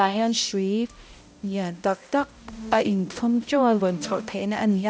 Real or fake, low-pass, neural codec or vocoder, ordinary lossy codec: fake; none; codec, 16 kHz, 0.5 kbps, X-Codec, HuBERT features, trained on balanced general audio; none